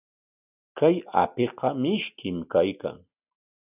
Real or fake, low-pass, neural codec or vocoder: real; 3.6 kHz; none